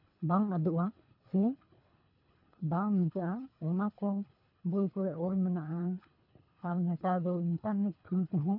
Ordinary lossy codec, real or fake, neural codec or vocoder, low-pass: none; fake; codec, 24 kHz, 3 kbps, HILCodec; 5.4 kHz